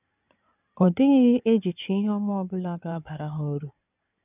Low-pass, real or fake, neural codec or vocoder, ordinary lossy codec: 3.6 kHz; fake; codec, 16 kHz in and 24 kHz out, 2.2 kbps, FireRedTTS-2 codec; none